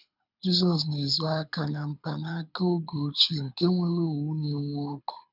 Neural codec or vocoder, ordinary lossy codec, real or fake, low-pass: codec, 24 kHz, 6 kbps, HILCodec; none; fake; 5.4 kHz